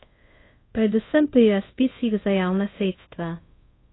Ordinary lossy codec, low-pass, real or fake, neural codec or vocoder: AAC, 16 kbps; 7.2 kHz; fake; codec, 24 kHz, 0.5 kbps, DualCodec